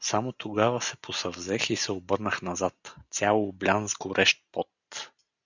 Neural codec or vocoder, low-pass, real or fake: none; 7.2 kHz; real